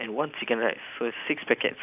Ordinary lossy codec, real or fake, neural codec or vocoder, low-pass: none; real; none; 3.6 kHz